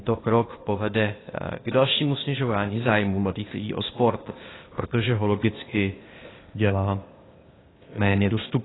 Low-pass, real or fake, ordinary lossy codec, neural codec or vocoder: 7.2 kHz; fake; AAC, 16 kbps; codec, 16 kHz, 0.8 kbps, ZipCodec